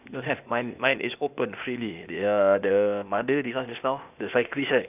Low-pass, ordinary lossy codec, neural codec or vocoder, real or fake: 3.6 kHz; none; codec, 16 kHz, 0.8 kbps, ZipCodec; fake